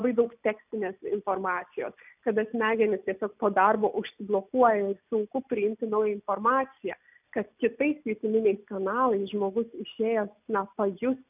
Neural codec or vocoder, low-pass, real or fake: none; 3.6 kHz; real